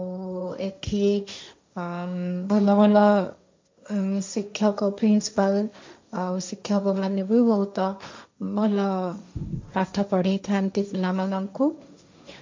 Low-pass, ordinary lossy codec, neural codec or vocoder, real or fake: 7.2 kHz; MP3, 64 kbps; codec, 16 kHz, 1.1 kbps, Voila-Tokenizer; fake